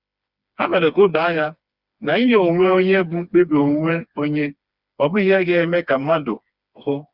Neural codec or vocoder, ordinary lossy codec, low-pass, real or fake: codec, 16 kHz, 2 kbps, FreqCodec, smaller model; Opus, 64 kbps; 5.4 kHz; fake